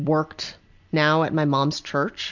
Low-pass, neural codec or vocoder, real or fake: 7.2 kHz; none; real